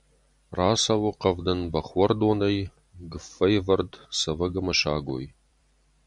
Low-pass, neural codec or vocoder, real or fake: 10.8 kHz; vocoder, 44.1 kHz, 128 mel bands every 256 samples, BigVGAN v2; fake